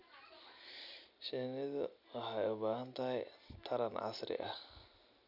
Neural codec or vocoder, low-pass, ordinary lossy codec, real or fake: none; 5.4 kHz; none; real